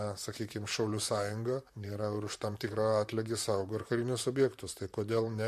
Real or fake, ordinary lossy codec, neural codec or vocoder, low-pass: fake; MP3, 64 kbps; vocoder, 44.1 kHz, 128 mel bands, Pupu-Vocoder; 14.4 kHz